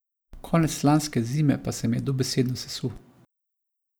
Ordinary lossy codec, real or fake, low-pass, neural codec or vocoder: none; real; none; none